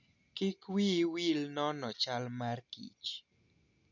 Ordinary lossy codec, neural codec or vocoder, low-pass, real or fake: none; none; 7.2 kHz; real